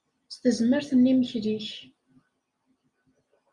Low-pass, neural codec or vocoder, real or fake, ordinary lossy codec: 10.8 kHz; none; real; Opus, 64 kbps